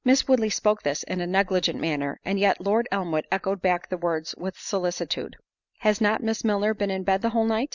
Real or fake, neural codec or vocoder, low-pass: real; none; 7.2 kHz